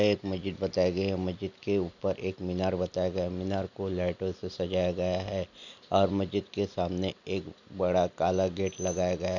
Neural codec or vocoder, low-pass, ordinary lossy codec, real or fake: none; 7.2 kHz; none; real